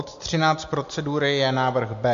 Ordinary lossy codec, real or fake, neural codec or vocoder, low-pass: MP3, 64 kbps; real; none; 7.2 kHz